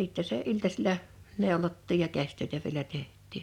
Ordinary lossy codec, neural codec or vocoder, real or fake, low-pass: none; none; real; 19.8 kHz